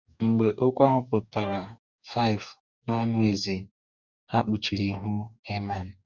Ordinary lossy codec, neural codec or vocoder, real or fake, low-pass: none; codec, 44.1 kHz, 2.6 kbps, DAC; fake; 7.2 kHz